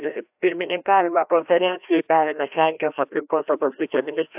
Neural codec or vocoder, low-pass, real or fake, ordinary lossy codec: codec, 16 kHz, 1 kbps, FreqCodec, larger model; 3.6 kHz; fake; AAC, 32 kbps